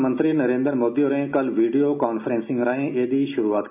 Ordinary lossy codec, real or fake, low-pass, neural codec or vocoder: AAC, 32 kbps; real; 3.6 kHz; none